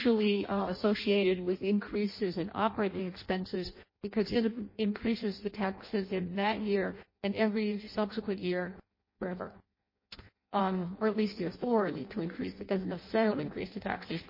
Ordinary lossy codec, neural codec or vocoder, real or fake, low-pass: MP3, 24 kbps; codec, 16 kHz in and 24 kHz out, 0.6 kbps, FireRedTTS-2 codec; fake; 5.4 kHz